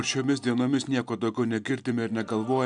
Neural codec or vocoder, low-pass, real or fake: none; 9.9 kHz; real